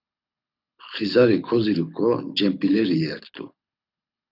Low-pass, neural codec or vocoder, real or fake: 5.4 kHz; codec, 24 kHz, 6 kbps, HILCodec; fake